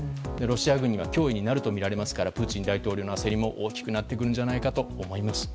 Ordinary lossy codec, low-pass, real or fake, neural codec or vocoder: none; none; real; none